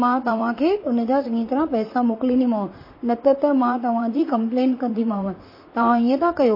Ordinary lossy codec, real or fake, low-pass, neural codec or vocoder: MP3, 24 kbps; fake; 5.4 kHz; vocoder, 44.1 kHz, 128 mel bands, Pupu-Vocoder